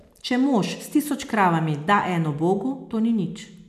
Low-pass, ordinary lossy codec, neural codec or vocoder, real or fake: 14.4 kHz; none; none; real